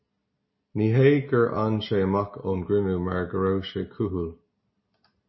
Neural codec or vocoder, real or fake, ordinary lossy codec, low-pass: none; real; MP3, 24 kbps; 7.2 kHz